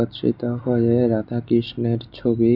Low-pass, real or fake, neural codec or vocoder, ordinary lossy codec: 5.4 kHz; real; none; none